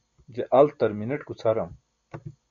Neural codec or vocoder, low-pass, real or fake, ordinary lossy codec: none; 7.2 kHz; real; MP3, 64 kbps